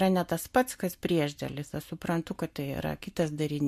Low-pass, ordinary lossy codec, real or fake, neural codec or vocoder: 14.4 kHz; MP3, 64 kbps; real; none